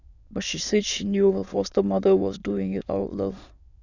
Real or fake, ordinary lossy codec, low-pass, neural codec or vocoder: fake; none; 7.2 kHz; autoencoder, 22.05 kHz, a latent of 192 numbers a frame, VITS, trained on many speakers